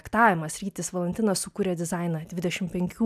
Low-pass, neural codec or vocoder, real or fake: 14.4 kHz; none; real